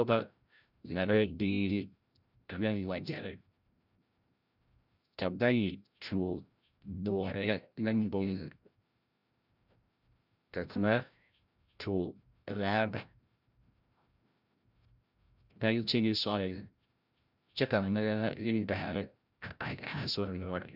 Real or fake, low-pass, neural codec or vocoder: fake; 5.4 kHz; codec, 16 kHz, 0.5 kbps, FreqCodec, larger model